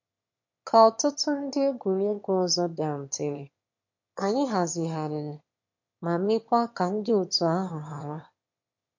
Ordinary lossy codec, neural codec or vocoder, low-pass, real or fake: MP3, 48 kbps; autoencoder, 22.05 kHz, a latent of 192 numbers a frame, VITS, trained on one speaker; 7.2 kHz; fake